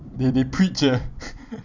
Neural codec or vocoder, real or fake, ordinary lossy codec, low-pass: vocoder, 44.1 kHz, 128 mel bands every 256 samples, BigVGAN v2; fake; none; 7.2 kHz